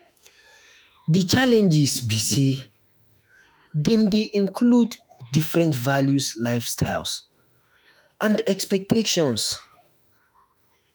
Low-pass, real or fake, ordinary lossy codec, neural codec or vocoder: none; fake; none; autoencoder, 48 kHz, 32 numbers a frame, DAC-VAE, trained on Japanese speech